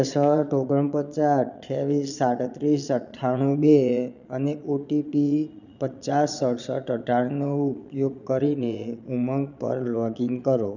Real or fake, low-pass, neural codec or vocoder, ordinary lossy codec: fake; 7.2 kHz; vocoder, 22.05 kHz, 80 mel bands, Vocos; none